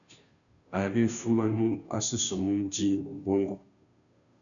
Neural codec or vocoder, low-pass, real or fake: codec, 16 kHz, 0.5 kbps, FunCodec, trained on Chinese and English, 25 frames a second; 7.2 kHz; fake